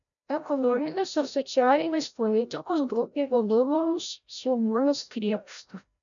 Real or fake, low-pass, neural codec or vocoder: fake; 7.2 kHz; codec, 16 kHz, 0.5 kbps, FreqCodec, larger model